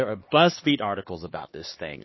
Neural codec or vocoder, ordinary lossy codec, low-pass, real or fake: codec, 16 kHz, 2 kbps, X-Codec, HuBERT features, trained on LibriSpeech; MP3, 24 kbps; 7.2 kHz; fake